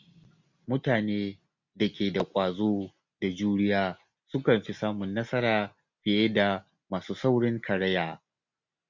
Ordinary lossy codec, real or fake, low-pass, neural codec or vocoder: MP3, 64 kbps; real; 7.2 kHz; none